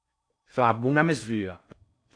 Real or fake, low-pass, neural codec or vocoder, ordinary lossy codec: fake; 9.9 kHz; codec, 16 kHz in and 24 kHz out, 0.6 kbps, FocalCodec, streaming, 4096 codes; AAC, 64 kbps